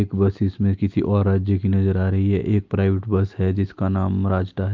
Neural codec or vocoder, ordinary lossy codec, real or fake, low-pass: none; Opus, 24 kbps; real; 7.2 kHz